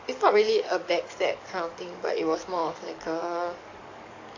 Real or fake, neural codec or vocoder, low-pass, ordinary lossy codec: fake; codec, 44.1 kHz, 7.8 kbps, DAC; 7.2 kHz; AAC, 48 kbps